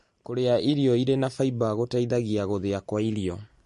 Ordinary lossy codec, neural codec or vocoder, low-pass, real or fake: MP3, 48 kbps; none; 14.4 kHz; real